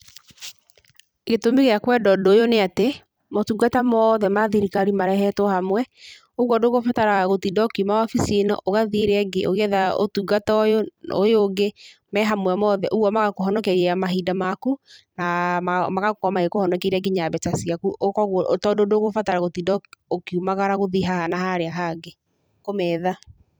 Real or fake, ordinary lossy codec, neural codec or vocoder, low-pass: fake; none; vocoder, 44.1 kHz, 128 mel bands every 256 samples, BigVGAN v2; none